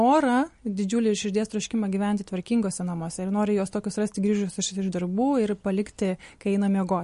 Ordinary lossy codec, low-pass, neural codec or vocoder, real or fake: MP3, 48 kbps; 10.8 kHz; none; real